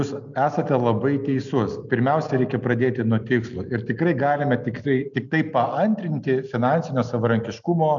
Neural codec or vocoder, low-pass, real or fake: none; 7.2 kHz; real